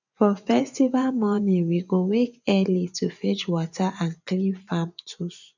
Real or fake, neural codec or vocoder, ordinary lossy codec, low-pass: fake; vocoder, 24 kHz, 100 mel bands, Vocos; none; 7.2 kHz